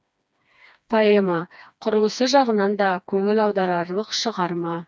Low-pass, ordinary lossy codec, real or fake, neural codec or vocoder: none; none; fake; codec, 16 kHz, 2 kbps, FreqCodec, smaller model